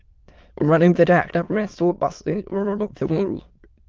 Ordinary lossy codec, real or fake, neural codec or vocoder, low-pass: Opus, 24 kbps; fake; autoencoder, 22.05 kHz, a latent of 192 numbers a frame, VITS, trained on many speakers; 7.2 kHz